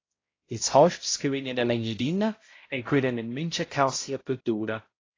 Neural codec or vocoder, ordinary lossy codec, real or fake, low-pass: codec, 16 kHz, 0.5 kbps, X-Codec, HuBERT features, trained on balanced general audio; AAC, 32 kbps; fake; 7.2 kHz